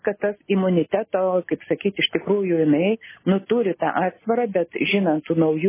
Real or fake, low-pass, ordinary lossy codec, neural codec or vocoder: real; 3.6 kHz; MP3, 16 kbps; none